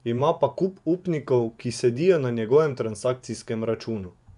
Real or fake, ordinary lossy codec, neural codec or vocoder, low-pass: fake; none; vocoder, 24 kHz, 100 mel bands, Vocos; 10.8 kHz